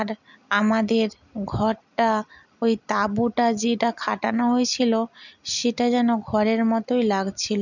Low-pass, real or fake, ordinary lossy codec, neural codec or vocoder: 7.2 kHz; real; none; none